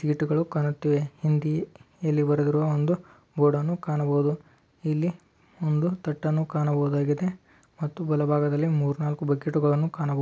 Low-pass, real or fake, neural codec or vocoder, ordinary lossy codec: none; real; none; none